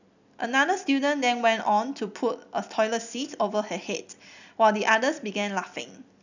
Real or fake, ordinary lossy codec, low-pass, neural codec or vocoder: real; none; 7.2 kHz; none